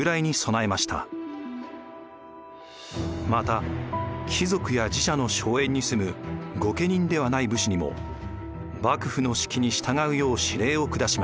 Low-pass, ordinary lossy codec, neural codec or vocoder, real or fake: none; none; none; real